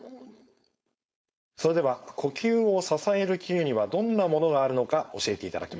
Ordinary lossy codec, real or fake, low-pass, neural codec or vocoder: none; fake; none; codec, 16 kHz, 4.8 kbps, FACodec